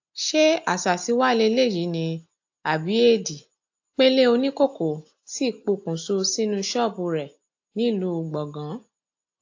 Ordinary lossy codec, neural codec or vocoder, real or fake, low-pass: AAC, 48 kbps; none; real; 7.2 kHz